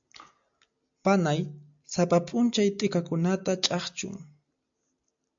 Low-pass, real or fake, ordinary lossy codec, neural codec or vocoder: 7.2 kHz; real; AAC, 64 kbps; none